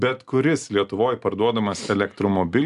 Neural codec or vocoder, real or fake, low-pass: none; real; 10.8 kHz